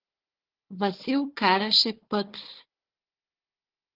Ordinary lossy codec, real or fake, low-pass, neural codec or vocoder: Opus, 16 kbps; fake; 5.4 kHz; codec, 16 kHz, 4 kbps, FunCodec, trained on Chinese and English, 50 frames a second